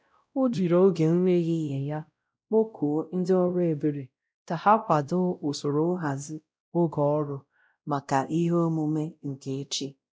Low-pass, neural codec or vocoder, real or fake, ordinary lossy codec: none; codec, 16 kHz, 0.5 kbps, X-Codec, WavLM features, trained on Multilingual LibriSpeech; fake; none